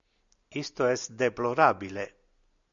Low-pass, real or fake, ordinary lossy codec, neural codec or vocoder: 7.2 kHz; real; MP3, 64 kbps; none